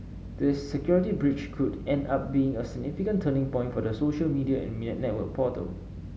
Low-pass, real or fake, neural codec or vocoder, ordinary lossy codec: none; real; none; none